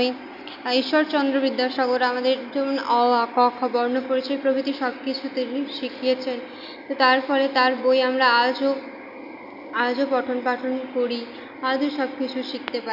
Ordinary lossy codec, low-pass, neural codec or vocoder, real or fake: none; 5.4 kHz; none; real